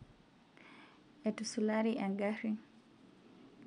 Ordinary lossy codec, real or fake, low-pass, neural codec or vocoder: none; real; 9.9 kHz; none